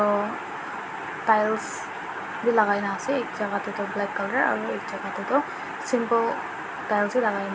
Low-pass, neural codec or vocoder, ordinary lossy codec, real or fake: none; none; none; real